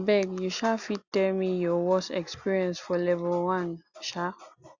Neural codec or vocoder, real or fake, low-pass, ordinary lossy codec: none; real; 7.2 kHz; Opus, 64 kbps